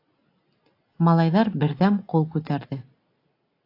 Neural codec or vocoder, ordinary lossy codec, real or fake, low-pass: none; AAC, 32 kbps; real; 5.4 kHz